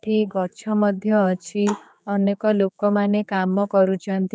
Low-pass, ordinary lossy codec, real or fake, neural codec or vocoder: none; none; fake; codec, 16 kHz, 4 kbps, X-Codec, HuBERT features, trained on general audio